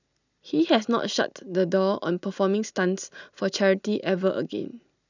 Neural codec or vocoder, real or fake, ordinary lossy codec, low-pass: none; real; none; 7.2 kHz